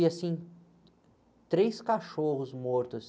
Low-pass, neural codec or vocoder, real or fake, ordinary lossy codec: none; none; real; none